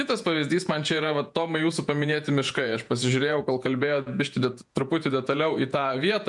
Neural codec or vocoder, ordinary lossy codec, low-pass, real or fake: vocoder, 48 kHz, 128 mel bands, Vocos; MP3, 64 kbps; 10.8 kHz; fake